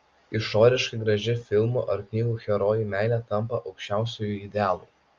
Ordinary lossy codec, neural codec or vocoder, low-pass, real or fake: Opus, 32 kbps; none; 7.2 kHz; real